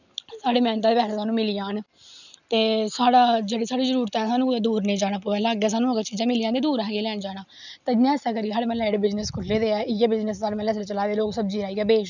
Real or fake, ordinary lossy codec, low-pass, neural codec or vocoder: real; none; 7.2 kHz; none